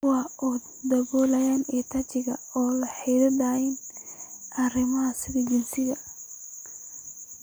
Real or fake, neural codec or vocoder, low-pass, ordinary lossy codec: real; none; none; none